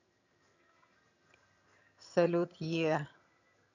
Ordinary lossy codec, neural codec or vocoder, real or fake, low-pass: none; vocoder, 22.05 kHz, 80 mel bands, HiFi-GAN; fake; 7.2 kHz